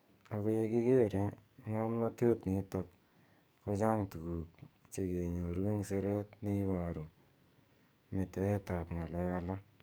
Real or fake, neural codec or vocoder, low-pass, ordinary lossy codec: fake; codec, 44.1 kHz, 2.6 kbps, SNAC; none; none